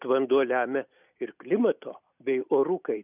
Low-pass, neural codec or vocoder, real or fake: 3.6 kHz; none; real